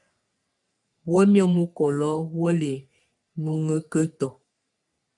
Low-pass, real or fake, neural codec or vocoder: 10.8 kHz; fake; codec, 44.1 kHz, 3.4 kbps, Pupu-Codec